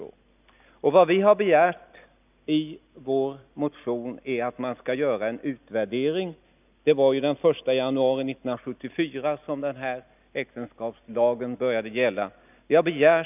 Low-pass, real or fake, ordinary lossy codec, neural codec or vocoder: 3.6 kHz; real; none; none